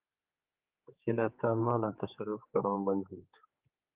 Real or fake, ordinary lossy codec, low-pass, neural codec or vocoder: fake; Opus, 24 kbps; 3.6 kHz; codec, 16 kHz, 4 kbps, X-Codec, HuBERT features, trained on general audio